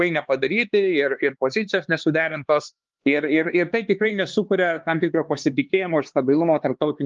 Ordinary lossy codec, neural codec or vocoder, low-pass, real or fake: Opus, 32 kbps; codec, 16 kHz, 2 kbps, X-Codec, HuBERT features, trained on LibriSpeech; 7.2 kHz; fake